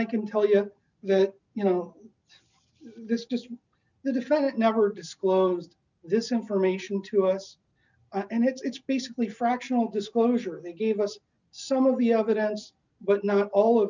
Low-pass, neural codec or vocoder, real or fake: 7.2 kHz; none; real